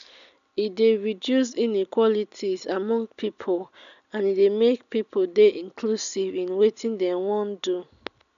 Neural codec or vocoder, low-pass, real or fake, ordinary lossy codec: none; 7.2 kHz; real; none